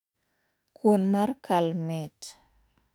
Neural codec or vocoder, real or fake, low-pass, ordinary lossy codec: autoencoder, 48 kHz, 32 numbers a frame, DAC-VAE, trained on Japanese speech; fake; 19.8 kHz; none